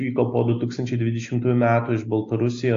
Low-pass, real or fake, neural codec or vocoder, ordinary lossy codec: 7.2 kHz; real; none; AAC, 48 kbps